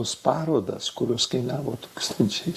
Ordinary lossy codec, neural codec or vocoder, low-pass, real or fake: Opus, 64 kbps; codec, 44.1 kHz, 7.8 kbps, Pupu-Codec; 14.4 kHz; fake